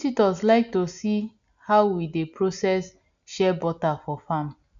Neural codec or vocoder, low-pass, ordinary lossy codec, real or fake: none; 7.2 kHz; none; real